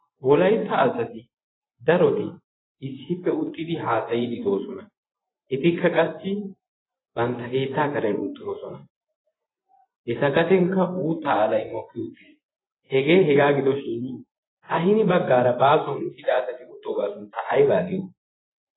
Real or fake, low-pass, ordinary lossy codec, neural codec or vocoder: fake; 7.2 kHz; AAC, 16 kbps; vocoder, 24 kHz, 100 mel bands, Vocos